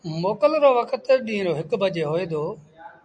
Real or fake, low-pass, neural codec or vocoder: real; 9.9 kHz; none